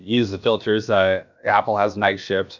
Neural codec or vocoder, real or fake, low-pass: codec, 16 kHz, about 1 kbps, DyCAST, with the encoder's durations; fake; 7.2 kHz